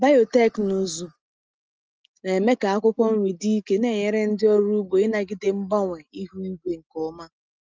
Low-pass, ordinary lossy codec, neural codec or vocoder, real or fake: 7.2 kHz; Opus, 24 kbps; none; real